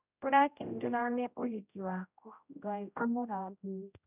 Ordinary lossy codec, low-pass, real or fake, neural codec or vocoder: none; 3.6 kHz; fake; codec, 16 kHz, 0.5 kbps, X-Codec, HuBERT features, trained on general audio